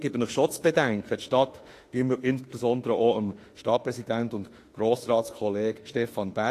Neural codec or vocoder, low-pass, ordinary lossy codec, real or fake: autoencoder, 48 kHz, 32 numbers a frame, DAC-VAE, trained on Japanese speech; 14.4 kHz; AAC, 48 kbps; fake